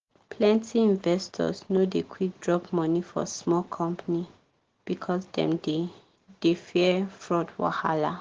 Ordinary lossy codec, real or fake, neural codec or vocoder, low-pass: Opus, 16 kbps; real; none; 7.2 kHz